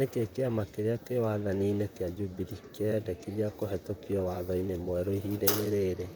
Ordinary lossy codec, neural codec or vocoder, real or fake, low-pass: none; vocoder, 44.1 kHz, 128 mel bands, Pupu-Vocoder; fake; none